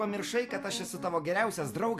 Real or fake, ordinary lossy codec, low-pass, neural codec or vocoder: real; AAC, 64 kbps; 14.4 kHz; none